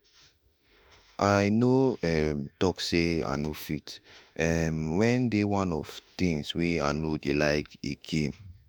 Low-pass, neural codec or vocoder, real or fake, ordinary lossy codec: none; autoencoder, 48 kHz, 32 numbers a frame, DAC-VAE, trained on Japanese speech; fake; none